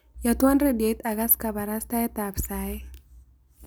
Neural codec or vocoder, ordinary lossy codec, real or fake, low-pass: none; none; real; none